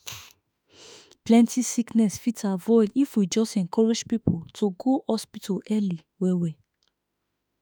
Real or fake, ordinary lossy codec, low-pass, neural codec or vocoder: fake; none; none; autoencoder, 48 kHz, 32 numbers a frame, DAC-VAE, trained on Japanese speech